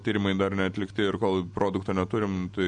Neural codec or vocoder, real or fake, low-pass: none; real; 9.9 kHz